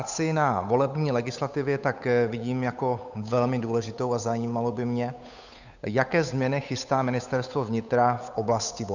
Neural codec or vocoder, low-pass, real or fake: codec, 16 kHz, 8 kbps, FunCodec, trained on Chinese and English, 25 frames a second; 7.2 kHz; fake